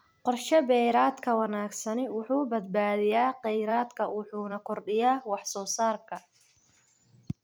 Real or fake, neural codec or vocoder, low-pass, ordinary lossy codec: fake; vocoder, 44.1 kHz, 128 mel bands every 256 samples, BigVGAN v2; none; none